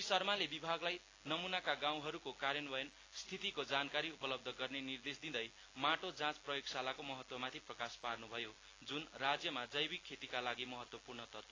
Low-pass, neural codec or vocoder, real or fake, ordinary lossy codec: 7.2 kHz; none; real; AAC, 32 kbps